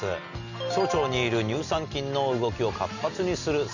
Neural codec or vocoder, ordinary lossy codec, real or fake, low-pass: none; none; real; 7.2 kHz